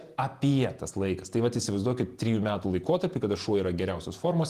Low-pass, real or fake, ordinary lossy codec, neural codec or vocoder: 14.4 kHz; fake; Opus, 24 kbps; vocoder, 48 kHz, 128 mel bands, Vocos